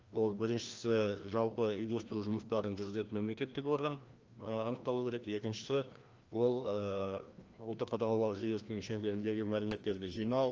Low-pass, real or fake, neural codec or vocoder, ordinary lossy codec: 7.2 kHz; fake; codec, 16 kHz, 1 kbps, FreqCodec, larger model; Opus, 24 kbps